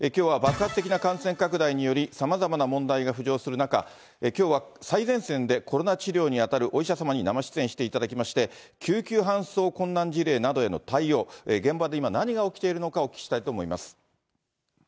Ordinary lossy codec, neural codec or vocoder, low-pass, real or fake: none; none; none; real